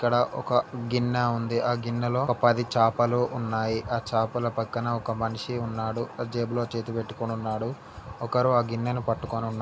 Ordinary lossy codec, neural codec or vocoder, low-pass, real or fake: none; none; none; real